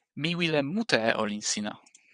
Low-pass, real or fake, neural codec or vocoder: 9.9 kHz; fake; vocoder, 22.05 kHz, 80 mel bands, WaveNeXt